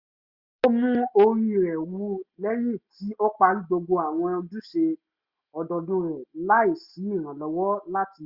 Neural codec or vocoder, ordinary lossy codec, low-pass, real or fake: none; Opus, 64 kbps; 5.4 kHz; real